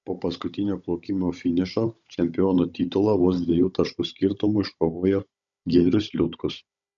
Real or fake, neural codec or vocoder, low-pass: fake; codec, 16 kHz, 16 kbps, FunCodec, trained on Chinese and English, 50 frames a second; 7.2 kHz